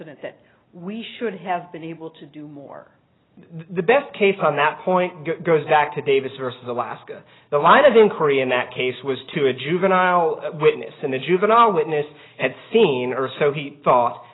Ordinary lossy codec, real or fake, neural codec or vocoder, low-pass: AAC, 16 kbps; real; none; 7.2 kHz